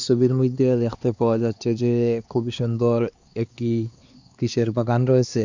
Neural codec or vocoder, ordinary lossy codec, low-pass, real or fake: codec, 16 kHz, 2 kbps, X-Codec, HuBERT features, trained on LibriSpeech; Opus, 64 kbps; 7.2 kHz; fake